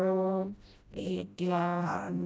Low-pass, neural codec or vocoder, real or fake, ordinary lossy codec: none; codec, 16 kHz, 0.5 kbps, FreqCodec, smaller model; fake; none